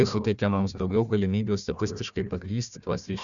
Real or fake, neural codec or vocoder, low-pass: fake; codec, 16 kHz, 1 kbps, FunCodec, trained on Chinese and English, 50 frames a second; 7.2 kHz